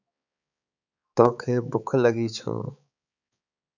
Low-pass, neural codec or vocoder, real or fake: 7.2 kHz; codec, 16 kHz, 4 kbps, X-Codec, HuBERT features, trained on balanced general audio; fake